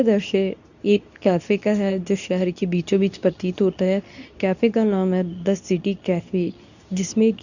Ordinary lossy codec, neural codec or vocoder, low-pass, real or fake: none; codec, 24 kHz, 0.9 kbps, WavTokenizer, medium speech release version 2; 7.2 kHz; fake